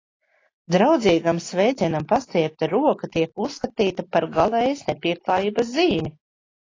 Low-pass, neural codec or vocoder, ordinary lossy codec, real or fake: 7.2 kHz; none; AAC, 32 kbps; real